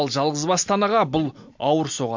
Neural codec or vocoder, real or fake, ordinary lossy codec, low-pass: none; real; MP3, 48 kbps; 7.2 kHz